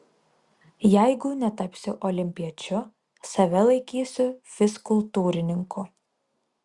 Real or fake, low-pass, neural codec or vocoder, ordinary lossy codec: real; 10.8 kHz; none; Opus, 64 kbps